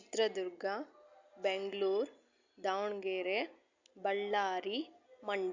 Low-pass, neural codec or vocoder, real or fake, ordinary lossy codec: 7.2 kHz; none; real; Opus, 64 kbps